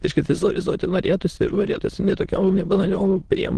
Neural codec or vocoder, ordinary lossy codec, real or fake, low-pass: autoencoder, 22.05 kHz, a latent of 192 numbers a frame, VITS, trained on many speakers; Opus, 16 kbps; fake; 9.9 kHz